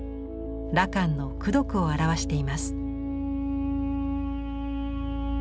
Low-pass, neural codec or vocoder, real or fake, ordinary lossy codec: none; none; real; none